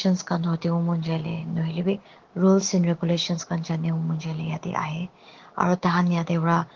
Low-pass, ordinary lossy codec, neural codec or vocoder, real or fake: 7.2 kHz; Opus, 16 kbps; codec, 16 kHz, 6 kbps, DAC; fake